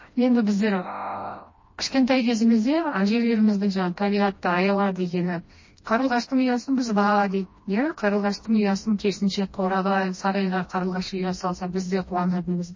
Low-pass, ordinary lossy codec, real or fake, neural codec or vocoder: 7.2 kHz; MP3, 32 kbps; fake; codec, 16 kHz, 1 kbps, FreqCodec, smaller model